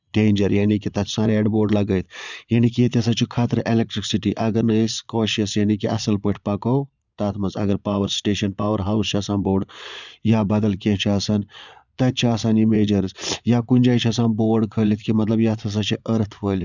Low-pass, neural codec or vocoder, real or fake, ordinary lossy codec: 7.2 kHz; vocoder, 22.05 kHz, 80 mel bands, Vocos; fake; none